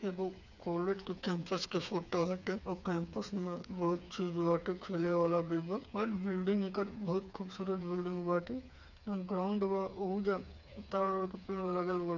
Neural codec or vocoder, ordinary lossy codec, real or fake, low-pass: codec, 16 kHz, 4 kbps, FreqCodec, smaller model; none; fake; 7.2 kHz